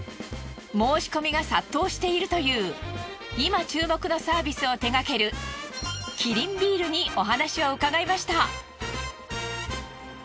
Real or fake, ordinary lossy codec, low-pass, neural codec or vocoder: real; none; none; none